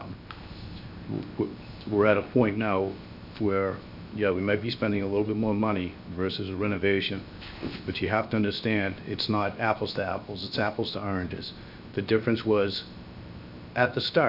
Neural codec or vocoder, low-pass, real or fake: codec, 16 kHz, 0.7 kbps, FocalCodec; 5.4 kHz; fake